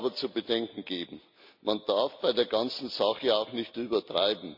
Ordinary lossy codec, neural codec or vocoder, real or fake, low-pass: MP3, 48 kbps; none; real; 5.4 kHz